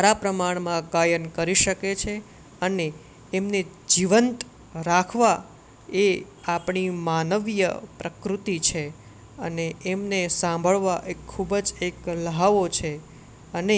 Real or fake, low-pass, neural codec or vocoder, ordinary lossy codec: real; none; none; none